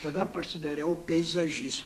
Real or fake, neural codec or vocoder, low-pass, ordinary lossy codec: fake; codec, 32 kHz, 1.9 kbps, SNAC; 14.4 kHz; MP3, 64 kbps